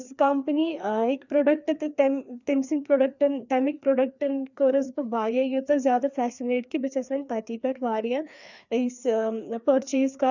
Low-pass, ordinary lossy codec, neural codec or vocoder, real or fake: 7.2 kHz; none; codec, 16 kHz, 2 kbps, FreqCodec, larger model; fake